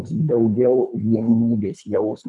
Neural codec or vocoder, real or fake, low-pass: codec, 24 kHz, 3 kbps, HILCodec; fake; 10.8 kHz